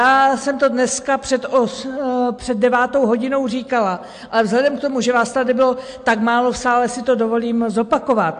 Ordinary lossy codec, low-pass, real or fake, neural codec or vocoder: AAC, 64 kbps; 9.9 kHz; real; none